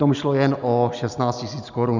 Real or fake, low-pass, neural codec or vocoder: real; 7.2 kHz; none